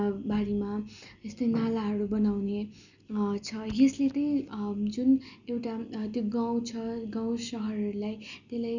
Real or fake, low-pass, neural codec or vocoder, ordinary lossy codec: real; 7.2 kHz; none; none